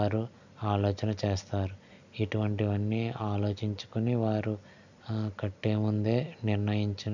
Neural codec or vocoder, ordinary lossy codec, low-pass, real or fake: none; none; 7.2 kHz; real